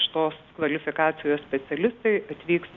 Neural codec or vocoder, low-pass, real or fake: none; 7.2 kHz; real